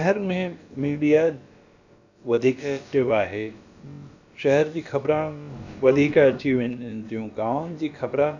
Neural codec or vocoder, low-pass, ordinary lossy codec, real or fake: codec, 16 kHz, about 1 kbps, DyCAST, with the encoder's durations; 7.2 kHz; none; fake